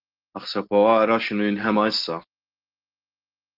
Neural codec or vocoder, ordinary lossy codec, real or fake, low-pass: none; Opus, 16 kbps; real; 5.4 kHz